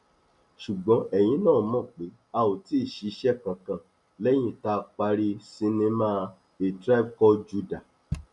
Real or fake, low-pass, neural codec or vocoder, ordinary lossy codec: real; 10.8 kHz; none; none